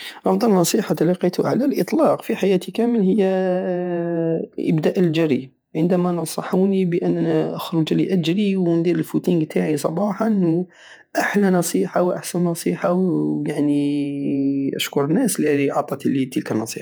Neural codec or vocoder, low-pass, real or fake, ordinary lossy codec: vocoder, 48 kHz, 128 mel bands, Vocos; none; fake; none